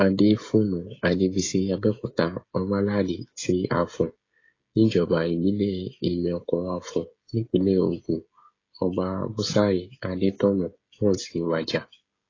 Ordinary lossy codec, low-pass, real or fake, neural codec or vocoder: AAC, 32 kbps; 7.2 kHz; fake; codec, 16 kHz, 16 kbps, FreqCodec, smaller model